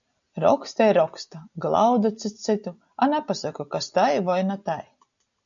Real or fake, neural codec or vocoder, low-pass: real; none; 7.2 kHz